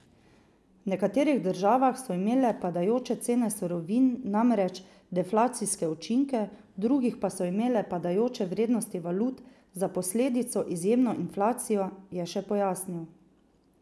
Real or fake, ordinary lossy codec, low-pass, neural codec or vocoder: real; none; none; none